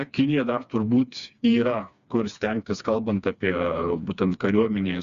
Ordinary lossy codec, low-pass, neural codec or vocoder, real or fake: Opus, 64 kbps; 7.2 kHz; codec, 16 kHz, 2 kbps, FreqCodec, smaller model; fake